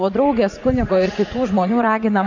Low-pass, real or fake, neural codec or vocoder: 7.2 kHz; fake; vocoder, 44.1 kHz, 80 mel bands, Vocos